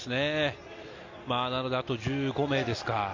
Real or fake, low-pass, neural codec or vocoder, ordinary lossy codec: real; 7.2 kHz; none; AAC, 48 kbps